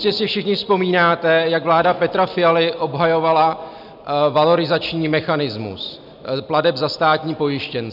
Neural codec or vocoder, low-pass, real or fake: none; 5.4 kHz; real